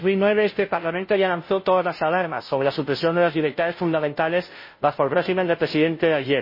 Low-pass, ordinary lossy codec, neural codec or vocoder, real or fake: 5.4 kHz; MP3, 24 kbps; codec, 16 kHz, 0.5 kbps, FunCodec, trained on Chinese and English, 25 frames a second; fake